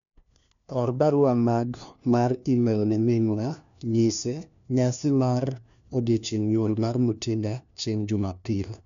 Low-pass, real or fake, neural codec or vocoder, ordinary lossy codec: 7.2 kHz; fake; codec, 16 kHz, 1 kbps, FunCodec, trained on LibriTTS, 50 frames a second; none